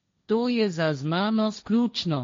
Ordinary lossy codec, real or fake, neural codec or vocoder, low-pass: MP3, 48 kbps; fake; codec, 16 kHz, 1.1 kbps, Voila-Tokenizer; 7.2 kHz